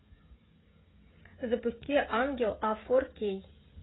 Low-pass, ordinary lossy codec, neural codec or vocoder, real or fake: 7.2 kHz; AAC, 16 kbps; codec, 16 kHz, 16 kbps, FreqCodec, smaller model; fake